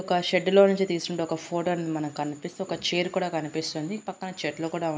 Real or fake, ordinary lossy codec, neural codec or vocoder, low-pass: real; none; none; none